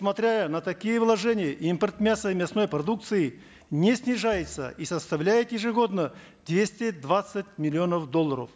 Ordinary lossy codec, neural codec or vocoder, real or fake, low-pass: none; none; real; none